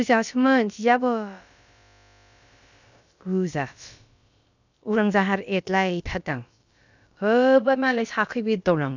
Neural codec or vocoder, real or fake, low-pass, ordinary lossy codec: codec, 16 kHz, about 1 kbps, DyCAST, with the encoder's durations; fake; 7.2 kHz; none